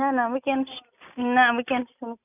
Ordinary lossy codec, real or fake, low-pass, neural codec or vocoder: none; real; 3.6 kHz; none